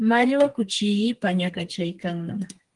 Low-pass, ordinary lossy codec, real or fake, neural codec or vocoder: 10.8 kHz; Opus, 24 kbps; fake; codec, 32 kHz, 1.9 kbps, SNAC